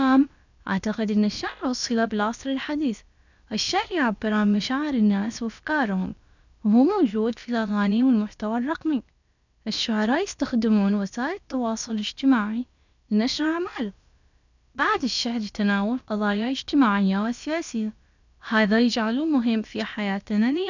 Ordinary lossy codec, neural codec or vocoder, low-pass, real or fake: none; codec, 16 kHz, about 1 kbps, DyCAST, with the encoder's durations; 7.2 kHz; fake